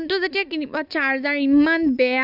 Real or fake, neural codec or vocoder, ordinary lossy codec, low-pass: real; none; none; 5.4 kHz